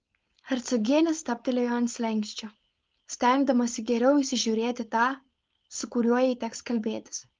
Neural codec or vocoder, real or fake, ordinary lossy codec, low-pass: codec, 16 kHz, 4.8 kbps, FACodec; fake; Opus, 24 kbps; 7.2 kHz